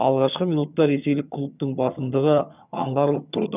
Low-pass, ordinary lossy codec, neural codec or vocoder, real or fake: 3.6 kHz; none; vocoder, 22.05 kHz, 80 mel bands, HiFi-GAN; fake